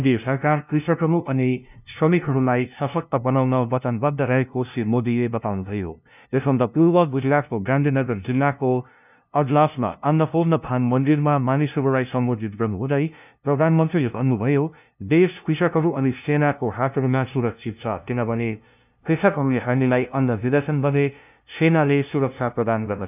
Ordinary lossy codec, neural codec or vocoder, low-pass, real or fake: none; codec, 16 kHz, 0.5 kbps, FunCodec, trained on LibriTTS, 25 frames a second; 3.6 kHz; fake